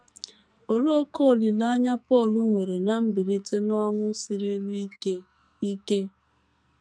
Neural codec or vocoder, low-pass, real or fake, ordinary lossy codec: codec, 44.1 kHz, 2.6 kbps, SNAC; 9.9 kHz; fake; none